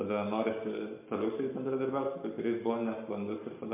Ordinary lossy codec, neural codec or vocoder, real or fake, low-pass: MP3, 32 kbps; codec, 44.1 kHz, 7.8 kbps, Pupu-Codec; fake; 3.6 kHz